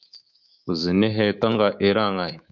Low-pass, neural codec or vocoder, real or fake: 7.2 kHz; codec, 24 kHz, 3.1 kbps, DualCodec; fake